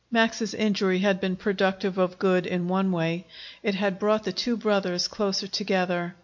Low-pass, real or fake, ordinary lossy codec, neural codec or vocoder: 7.2 kHz; real; MP3, 48 kbps; none